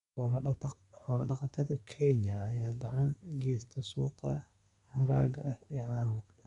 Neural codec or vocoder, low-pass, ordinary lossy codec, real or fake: codec, 24 kHz, 1 kbps, SNAC; 10.8 kHz; none; fake